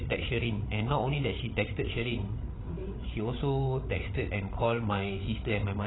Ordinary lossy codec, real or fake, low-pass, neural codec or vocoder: AAC, 16 kbps; fake; 7.2 kHz; codec, 16 kHz, 8 kbps, FreqCodec, larger model